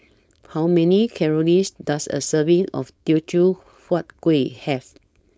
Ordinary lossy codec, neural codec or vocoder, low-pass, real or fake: none; codec, 16 kHz, 4.8 kbps, FACodec; none; fake